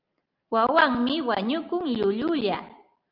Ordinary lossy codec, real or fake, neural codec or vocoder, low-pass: Opus, 24 kbps; real; none; 5.4 kHz